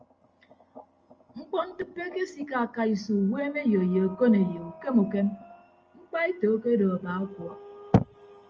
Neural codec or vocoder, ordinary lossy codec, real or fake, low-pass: none; Opus, 24 kbps; real; 7.2 kHz